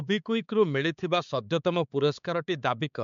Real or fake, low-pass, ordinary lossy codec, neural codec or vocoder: fake; 7.2 kHz; MP3, 64 kbps; codec, 16 kHz, 4 kbps, X-Codec, HuBERT features, trained on balanced general audio